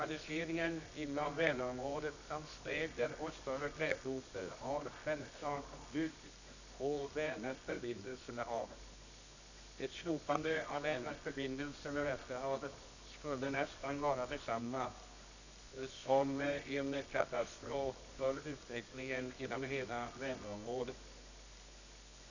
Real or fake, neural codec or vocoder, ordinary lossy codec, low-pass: fake; codec, 24 kHz, 0.9 kbps, WavTokenizer, medium music audio release; none; 7.2 kHz